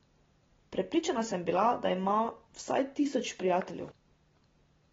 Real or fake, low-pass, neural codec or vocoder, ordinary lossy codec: real; 7.2 kHz; none; AAC, 24 kbps